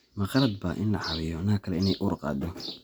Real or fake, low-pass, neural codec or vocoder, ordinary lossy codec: real; none; none; none